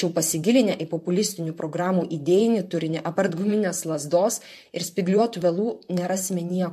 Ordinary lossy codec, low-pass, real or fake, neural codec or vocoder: MP3, 64 kbps; 14.4 kHz; fake; vocoder, 44.1 kHz, 128 mel bands, Pupu-Vocoder